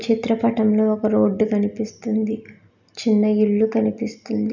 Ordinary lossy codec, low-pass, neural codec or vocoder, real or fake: none; 7.2 kHz; none; real